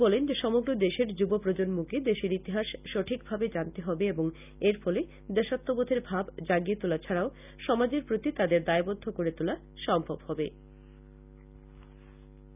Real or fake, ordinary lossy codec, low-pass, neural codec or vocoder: real; none; 3.6 kHz; none